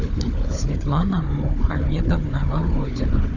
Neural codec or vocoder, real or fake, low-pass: codec, 16 kHz, 4 kbps, FunCodec, trained on Chinese and English, 50 frames a second; fake; 7.2 kHz